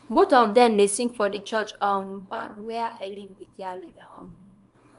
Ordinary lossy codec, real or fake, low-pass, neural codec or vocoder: none; fake; 10.8 kHz; codec, 24 kHz, 0.9 kbps, WavTokenizer, small release